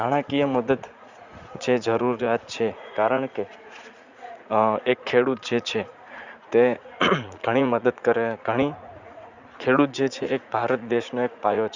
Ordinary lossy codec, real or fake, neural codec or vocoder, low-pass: Opus, 64 kbps; fake; vocoder, 44.1 kHz, 80 mel bands, Vocos; 7.2 kHz